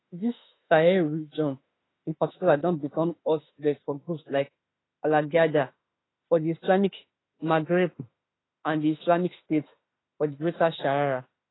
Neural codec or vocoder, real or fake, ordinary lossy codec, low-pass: autoencoder, 48 kHz, 32 numbers a frame, DAC-VAE, trained on Japanese speech; fake; AAC, 16 kbps; 7.2 kHz